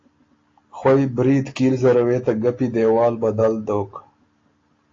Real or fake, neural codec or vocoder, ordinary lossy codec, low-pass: real; none; AAC, 32 kbps; 7.2 kHz